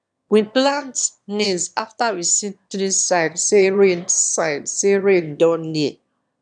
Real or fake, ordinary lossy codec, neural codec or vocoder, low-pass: fake; none; autoencoder, 22.05 kHz, a latent of 192 numbers a frame, VITS, trained on one speaker; 9.9 kHz